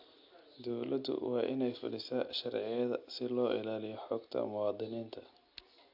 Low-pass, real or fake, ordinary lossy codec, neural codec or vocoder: 5.4 kHz; real; AAC, 48 kbps; none